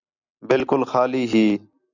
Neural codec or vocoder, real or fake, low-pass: none; real; 7.2 kHz